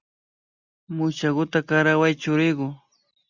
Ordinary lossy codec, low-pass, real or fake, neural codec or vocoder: Opus, 64 kbps; 7.2 kHz; real; none